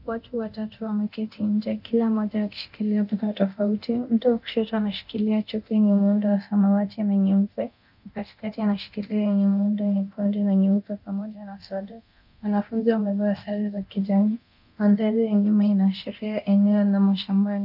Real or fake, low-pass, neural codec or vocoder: fake; 5.4 kHz; codec, 24 kHz, 0.9 kbps, DualCodec